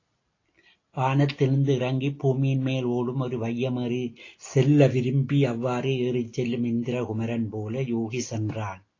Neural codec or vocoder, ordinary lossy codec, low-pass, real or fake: none; AAC, 32 kbps; 7.2 kHz; real